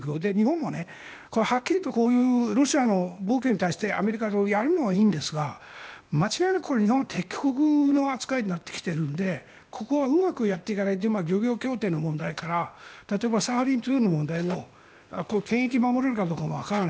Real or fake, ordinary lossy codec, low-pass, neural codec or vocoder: fake; none; none; codec, 16 kHz, 0.8 kbps, ZipCodec